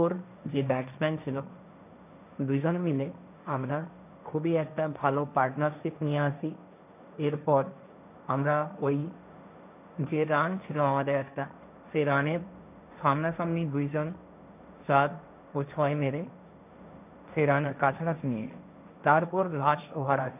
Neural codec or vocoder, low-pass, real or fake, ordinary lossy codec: codec, 16 kHz, 1.1 kbps, Voila-Tokenizer; 3.6 kHz; fake; none